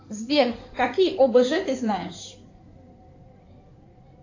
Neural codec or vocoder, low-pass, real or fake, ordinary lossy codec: codec, 16 kHz in and 24 kHz out, 2.2 kbps, FireRedTTS-2 codec; 7.2 kHz; fake; AAC, 48 kbps